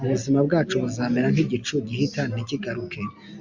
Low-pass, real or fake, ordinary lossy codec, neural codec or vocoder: 7.2 kHz; real; MP3, 64 kbps; none